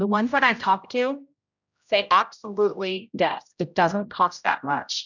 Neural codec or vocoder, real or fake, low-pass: codec, 16 kHz, 0.5 kbps, X-Codec, HuBERT features, trained on general audio; fake; 7.2 kHz